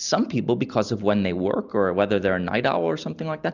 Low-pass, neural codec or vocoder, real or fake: 7.2 kHz; none; real